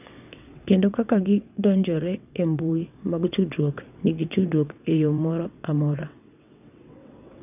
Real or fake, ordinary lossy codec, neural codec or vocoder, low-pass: fake; none; vocoder, 22.05 kHz, 80 mel bands, WaveNeXt; 3.6 kHz